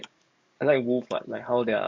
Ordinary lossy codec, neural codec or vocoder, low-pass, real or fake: MP3, 64 kbps; codec, 44.1 kHz, 7.8 kbps, DAC; 7.2 kHz; fake